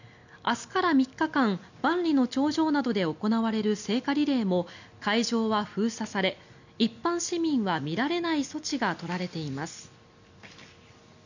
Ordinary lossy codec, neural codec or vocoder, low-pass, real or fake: none; none; 7.2 kHz; real